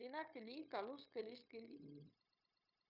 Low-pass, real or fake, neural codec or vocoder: 5.4 kHz; fake; codec, 16 kHz, 0.9 kbps, LongCat-Audio-Codec